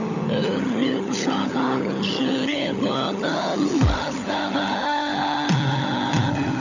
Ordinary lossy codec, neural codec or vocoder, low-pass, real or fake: none; codec, 16 kHz, 16 kbps, FunCodec, trained on LibriTTS, 50 frames a second; 7.2 kHz; fake